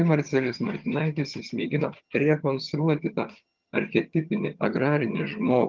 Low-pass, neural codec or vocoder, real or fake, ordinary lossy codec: 7.2 kHz; vocoder, 22.05 kHz, 80 mel bands, HiFi-GAN; fake; Opus, 32 kbps